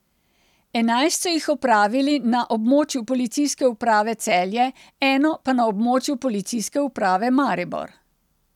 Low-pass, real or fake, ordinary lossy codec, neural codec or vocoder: 19.8 kHz; real; none; none